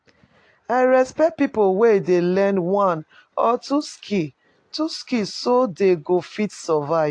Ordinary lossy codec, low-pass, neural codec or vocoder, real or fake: AAC, 48 kbps; 9.9 kHz; none; real